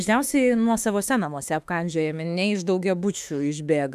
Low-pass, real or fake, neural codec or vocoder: 14.4 kHz; fake; autoencoder, 48 kHz, 32 numbers a frame, DAC-VAE, trained on Japanese speech